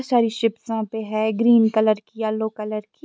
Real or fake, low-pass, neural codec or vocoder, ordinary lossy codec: real; none; none; none